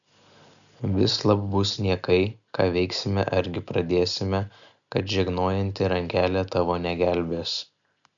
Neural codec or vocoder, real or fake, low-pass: none; real; 7.2 kHz